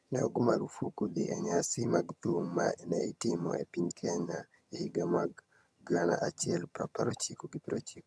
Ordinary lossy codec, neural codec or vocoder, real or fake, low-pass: none; vocoder, 22.05 kHz, 80 mel bands, HiFi-GAN; fake; none